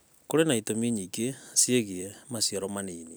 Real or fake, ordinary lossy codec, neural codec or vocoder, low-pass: fake; none; vocoder, 44.1 kHz, 128 mel bands every 256 samples, BigVGAN v2; none